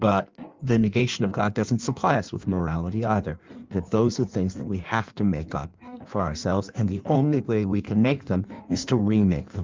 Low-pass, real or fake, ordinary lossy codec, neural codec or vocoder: 7.2 kHz; fake; Opus, 24 kbps; codec, 16 kHz in and 24 kHz out, 1.1 kbps, FireRedTTS-2 codec